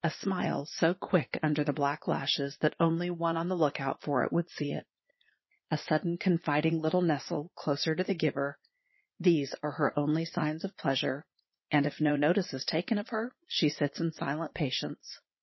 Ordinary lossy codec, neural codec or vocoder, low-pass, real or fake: MP3, 24 kbps; vocoder, 22.05 kHz, 80 mel bands, Vocos; 7.2 kHz; fake